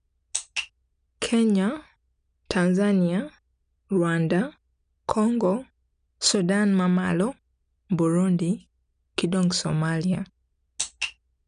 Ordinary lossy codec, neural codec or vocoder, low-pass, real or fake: none; none; 9.9 kHz; real